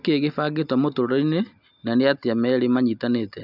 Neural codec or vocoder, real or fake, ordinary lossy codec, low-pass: none; real; none; 5.4 kHz